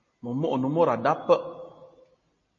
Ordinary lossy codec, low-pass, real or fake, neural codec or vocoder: MP3, 32 kbps; 7.2 kHz; real; none